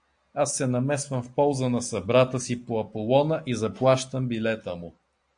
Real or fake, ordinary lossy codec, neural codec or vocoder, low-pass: real; AAC, 64 kbps; none; 9.9 kHz